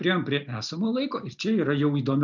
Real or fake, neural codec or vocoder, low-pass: real; none; 7.2 kHz